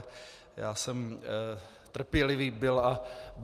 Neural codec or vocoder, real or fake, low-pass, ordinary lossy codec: none; real; 14.4 kHz; Opus, 32 kbps